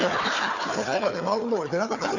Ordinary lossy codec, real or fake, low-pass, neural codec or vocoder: none; fake; 7.2 kHz; codec, 16 kHz, 4 kbps, FunCodec, trained on Chinese and English, 50 frames a second